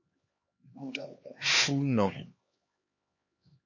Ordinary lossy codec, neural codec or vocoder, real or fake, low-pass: MP3, 32 kbps; codec, 16 kHz, 2 kbps, X-Codec, HuBERT features, trained on LibriSpeech; fake; 7.2 kHz